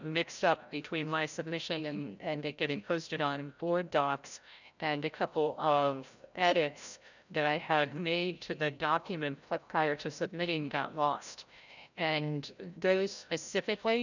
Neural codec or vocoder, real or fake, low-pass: codec, 16 kHz, 0.5 kbps, FreqCodec, larger model; fake; 7.2 kHz